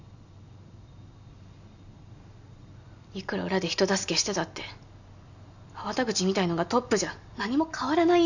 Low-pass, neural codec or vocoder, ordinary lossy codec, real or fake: 7.2 kHz; none; none; real